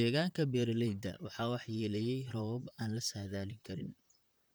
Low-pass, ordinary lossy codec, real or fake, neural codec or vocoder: none; none; fake; vocoder, 44.1 kHz, 128 mel bands, Pupu-Vocoder